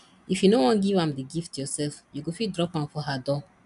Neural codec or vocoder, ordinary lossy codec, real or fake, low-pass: none; none; real; 10.8 kHz